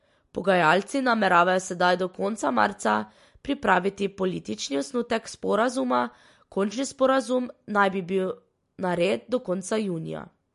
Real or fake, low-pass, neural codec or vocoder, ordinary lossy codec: real; 14.4 kHz; none; MP3, 48 kbps